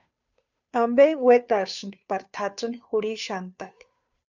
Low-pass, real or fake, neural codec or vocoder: 7.2 kHz; fake; codec, 16 kHz, 2 kbps, FunCodec, trained on Chinese and English, 25 frames a second